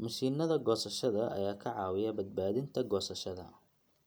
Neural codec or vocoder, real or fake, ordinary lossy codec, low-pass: none; real; none; none